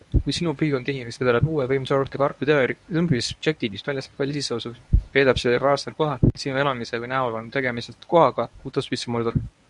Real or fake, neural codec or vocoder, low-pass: fake; codec, 24 kHz, 0.9 kbps, WavTokenizer, medium speech release version 1; 10.8 kHz